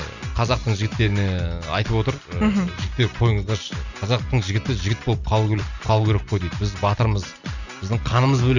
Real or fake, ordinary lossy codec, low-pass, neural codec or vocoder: real; none; 7.2 kHz; none